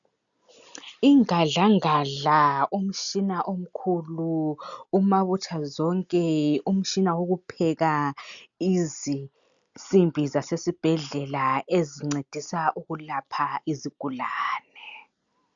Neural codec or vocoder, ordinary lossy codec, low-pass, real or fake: none; MP3, 96 kbps; 7.2 kHz; real